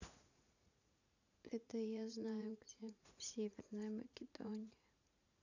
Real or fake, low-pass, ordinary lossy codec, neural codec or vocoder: fake; 7.2 kHz; none; vocoder, 44.1 kHz, 80 mel bands, Vocos